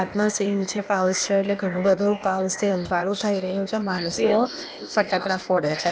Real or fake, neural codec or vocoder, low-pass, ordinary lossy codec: fake; codec, 16 kHz, 0.8 kbps, ZipCodec; none; none